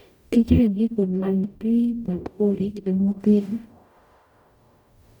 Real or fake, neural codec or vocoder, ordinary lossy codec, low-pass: fake; codec, 44.1 kHz, 0.9 kbps, DAC; none; 19.8 kHz